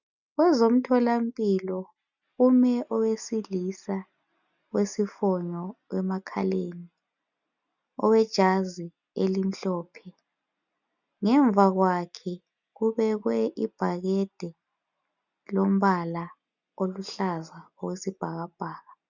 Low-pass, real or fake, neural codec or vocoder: 7.2 kHz; real; none